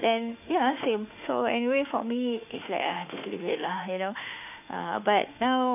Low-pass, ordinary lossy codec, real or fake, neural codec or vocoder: 3.6 kHz; none; fake; autoencoder, 48 kHz, 32 numbers a frame, DAC-VAE, trained on Japanese speech